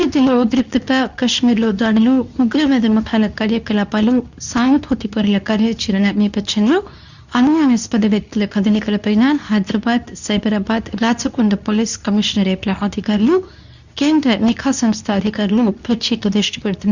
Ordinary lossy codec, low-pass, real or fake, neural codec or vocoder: none; 7.2 kHz; fake; codec, 24 kHz, 0.9 kbps, WavTokenizer, medium speech release version 2